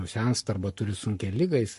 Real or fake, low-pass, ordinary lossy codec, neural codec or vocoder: fake; 14.4 kHz; MP3, 48 kbps; codec, 44.1 kHz, 7.8 kbps, Pupu-Codec